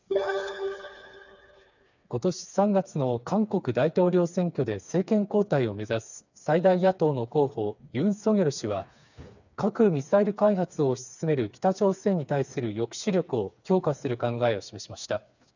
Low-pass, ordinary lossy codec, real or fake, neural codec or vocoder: 7.2 kHz; none; fake; codec, 16 kHz, 4 kbps, FreqCodec, smaller model